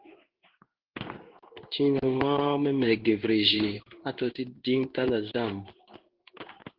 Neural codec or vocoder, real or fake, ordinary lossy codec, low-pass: codec, 16 kHz in and 24 kHz out, 1 kbps, XY-Tokenizer; fake; Opus, 16 kbps; 5.4 kHz